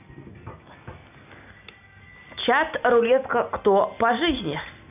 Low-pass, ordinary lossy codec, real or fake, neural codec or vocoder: 3.6 kHz; none; real; none